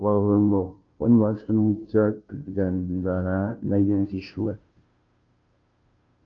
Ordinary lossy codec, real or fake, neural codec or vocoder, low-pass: Opus, 24 kbps; fake; codec, 16 kHz, 0.5 kbps, FunCodec, trained on Chinese and English, 25 frames a second; 7.2 kHz